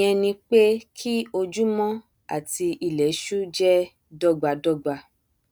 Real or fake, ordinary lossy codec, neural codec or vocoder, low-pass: real; none; none; none